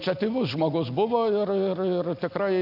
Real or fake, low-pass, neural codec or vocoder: real; 5.4 kHz; none